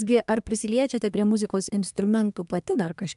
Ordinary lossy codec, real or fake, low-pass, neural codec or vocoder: AAC, 96 kbps; fake; 10.8 kHz; codec, 24 kHz, 1 kbps, SNAC